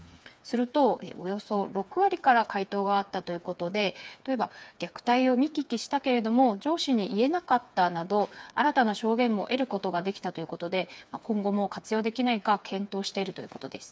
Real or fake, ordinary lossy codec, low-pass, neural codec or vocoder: fake; none; none; codec, 16 kHz, 4 kbps, FreqCodec, smaller model